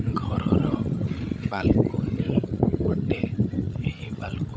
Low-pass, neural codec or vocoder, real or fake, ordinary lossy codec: none; codec, 16 kHz, 16 kbps, FreqCodec, larger model; fake; none